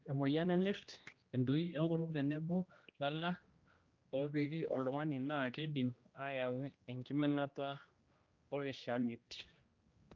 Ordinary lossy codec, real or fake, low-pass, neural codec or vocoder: Opus, 24 kbps; fake; 7.2 kHz; codec, 16 kHz, 1 kbps, X-Codec, HuBERT features, trained on general audio